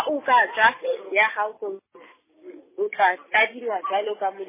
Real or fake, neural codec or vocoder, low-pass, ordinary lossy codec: real; none; 3.6 kHz; MP3, 16 kbps